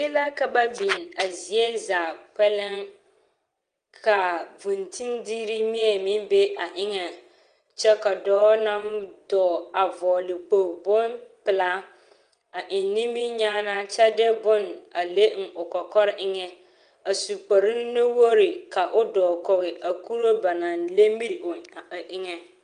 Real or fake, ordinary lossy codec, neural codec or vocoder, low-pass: fake; Opus, 32 kbps; vocoder, 22.05 kHz, 80 mel bands, WaveNeXt; 9.9 kHz